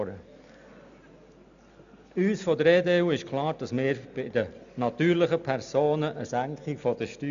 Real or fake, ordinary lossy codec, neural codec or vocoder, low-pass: real; none; none; 7.2 kHz